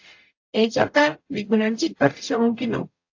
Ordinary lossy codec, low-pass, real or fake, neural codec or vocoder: AAC, 48 kbps; 7.2 kHz; fake; codec, 44.1 kHz, 0.9 kbps, DAC